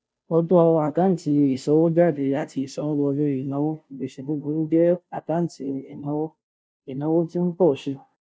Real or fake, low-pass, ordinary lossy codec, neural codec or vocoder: fake; none; none; codec, 16 kHz, 0.5 kbps, FunCodec, trained on Chinese and English, 25 frames a second